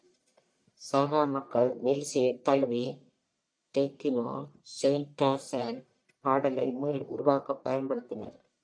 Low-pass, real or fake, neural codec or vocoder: 9.9 kHz; fake; codec, 44.1 kHz, 1.7 kbps, Pupu-Codec